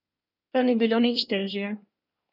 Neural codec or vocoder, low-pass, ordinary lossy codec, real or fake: codec, 24 kHz, 1 kbps, SNAC; 5.4 kHz; none; fake